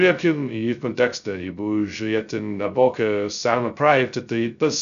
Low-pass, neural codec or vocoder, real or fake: 7.2 kHz; codec, 16 kHz, 0.2 kbps, FocalCodec; fake